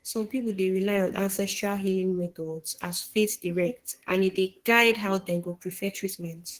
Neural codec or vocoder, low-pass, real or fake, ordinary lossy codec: codec, 32 kHz, 1.9 kbps, SNAC; 14.4 kHz; fake; Opus, 16 kbps